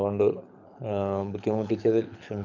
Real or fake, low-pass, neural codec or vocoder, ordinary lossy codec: fake; 7.2 kHz; codec, 24 kHz, 6 kbps, HILCodec; none